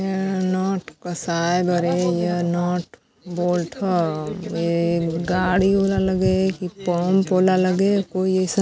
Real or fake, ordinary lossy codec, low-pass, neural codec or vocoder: real; none; none; none